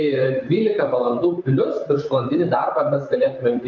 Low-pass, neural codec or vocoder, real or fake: 7.2 kHz; vocoder, 44.1 kHz, 128 mel bands, Pupu-Vocoder; fake